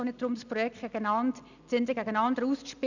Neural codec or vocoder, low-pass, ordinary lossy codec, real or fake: none; 7.2 kHz; none; real